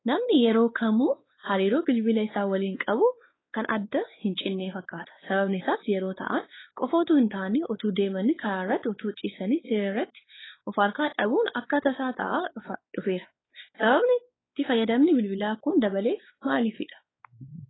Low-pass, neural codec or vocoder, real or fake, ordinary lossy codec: 7.2 kHz; codec, 16 kHz, 4 kbps, X-Codec, WavLM features, trained on Multilingual LibriSpeech; fake; AAC, 16 kbps